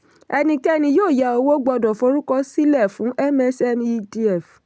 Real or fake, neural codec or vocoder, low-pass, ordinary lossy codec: real; none; none; none